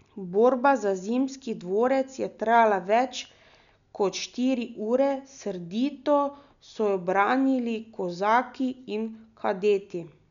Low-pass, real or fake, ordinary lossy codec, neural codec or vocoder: 7.2 kHz; real; none; none